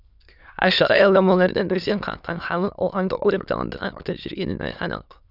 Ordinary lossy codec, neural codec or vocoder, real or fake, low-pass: none; autoencoder, 22.05 kHz, a latent of 192 numbers a frame, VITS, trained on many speakers; fake; 5.4 kHz